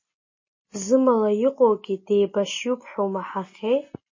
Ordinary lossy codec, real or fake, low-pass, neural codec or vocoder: MP3, 32 kbps; real; 7.2 kHz; none